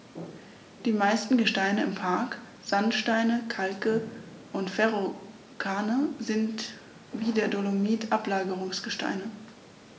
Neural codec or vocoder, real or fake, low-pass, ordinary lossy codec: none; real; none; none